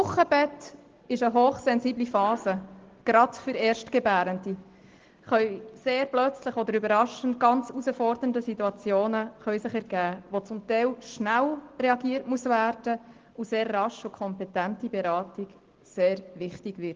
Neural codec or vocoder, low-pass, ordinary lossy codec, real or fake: none; 7.2 kHz; Opus, 16 kbps; real